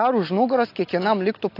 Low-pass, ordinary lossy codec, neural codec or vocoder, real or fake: 5.4 kHz; AAC, 32 kbps; none; real